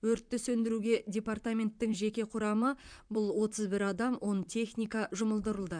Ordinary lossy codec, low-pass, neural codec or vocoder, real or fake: MP3, 96 kbps; 9.9 kHz; vocoder, 44.1 kHz, 128 mel bands, Pupu-Vocoder; fake